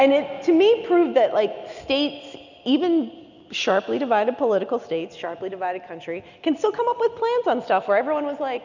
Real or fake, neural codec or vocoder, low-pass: real; none; 7.2 kHz